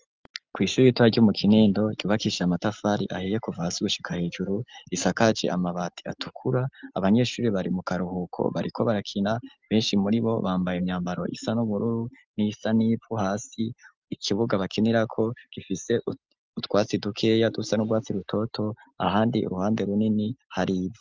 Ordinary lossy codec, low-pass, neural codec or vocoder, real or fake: Opus, 24 kbps; 7.2 kHz; none; real